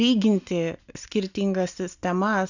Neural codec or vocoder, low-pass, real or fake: none; 7.2 kHz; real